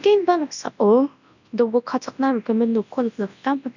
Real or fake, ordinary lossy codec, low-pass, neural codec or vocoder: fake; none; 7.2 kHz; codec, 24 kHz, 0.9 kbps, WavTokenizer, large speech release